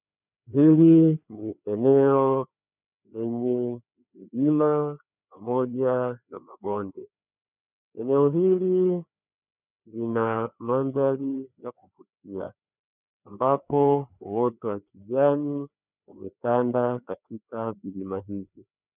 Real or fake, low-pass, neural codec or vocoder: fake; 3.6 kHz; codec, 16 kHz, 2 kbps, FreqCodec, larger model